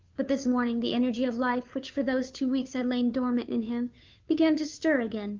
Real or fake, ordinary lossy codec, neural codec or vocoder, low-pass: fake; Opus, 32 kbps; codec, 16 kHz, 2 kbps, FunCodec, trained on Chinese and English, 25 frames a second; 7.2 kHz